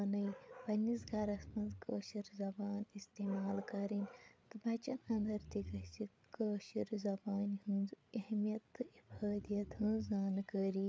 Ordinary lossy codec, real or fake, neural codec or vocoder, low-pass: none; fake; codec, 16 kHz, 8 kbps, FreqCodec, larger model; none